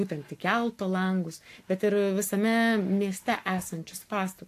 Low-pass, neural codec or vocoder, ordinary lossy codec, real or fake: 14.4 kHz; codec, 44.1 kHz, 7.8 kbps, Pupu-Codec; AAC, 64 kbps; fake